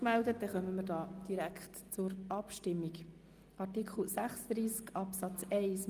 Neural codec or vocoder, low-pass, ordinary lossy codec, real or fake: none; 14.4 kHz; Opus, 32 kbps; real